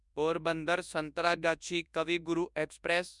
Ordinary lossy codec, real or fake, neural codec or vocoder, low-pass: none; fake; codec, 24 kHz, 0.9 kbps, WavTokenizer, large speech release; 10.8 kHz